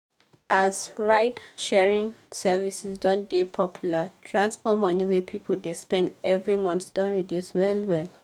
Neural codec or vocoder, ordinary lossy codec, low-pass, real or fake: codec, 44.1 kHz, 2.6 kbps, DAC; none; 19.8 kHz; fake